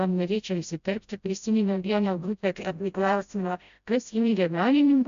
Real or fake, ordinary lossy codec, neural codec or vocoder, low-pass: fake; MP3, 64 kbps; codec, 16 kHz, 0.5 kbps, FreqCodec, smaller model; 7.2 kHz